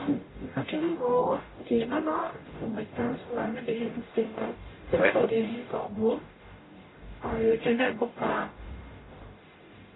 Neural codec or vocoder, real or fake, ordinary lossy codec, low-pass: codec, 44.1 kHz, 0.9 kbps, DAC; fake; AAC, 16 kbps; 7.2 kHz